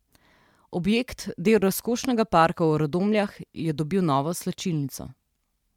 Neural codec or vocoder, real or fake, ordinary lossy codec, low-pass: vocoder, 44.1 kHz, 128 mel bands every 512 samples, BigVGAN v2; fake; MP3, 96 kbps; 19.8 kHz